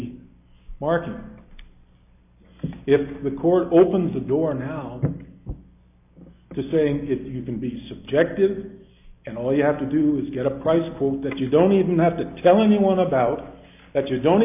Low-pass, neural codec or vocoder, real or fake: 3.6 kHz; none; real